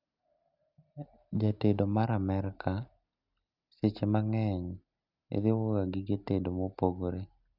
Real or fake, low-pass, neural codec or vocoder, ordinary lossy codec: real; 5.4 kHz; none; Opus, 64 kbps